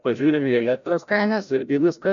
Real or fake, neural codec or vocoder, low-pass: fake; codec, 16 kHz, 0.5 kbps, FreqCodec, larger model; 7.2 kHz